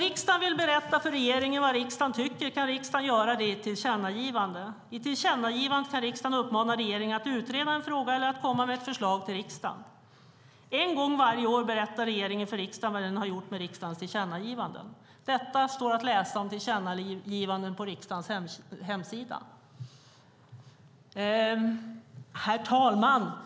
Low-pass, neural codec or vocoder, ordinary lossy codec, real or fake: none; none; none; real